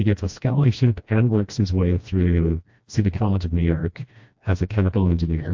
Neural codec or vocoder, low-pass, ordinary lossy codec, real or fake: codec, 16 kHz, 1 kbps, FreqCodec, smaller model; 7.2 kHz; MP3, 48 kbps; fake